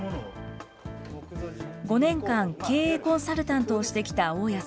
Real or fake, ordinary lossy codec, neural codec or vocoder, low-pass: real; none; none; none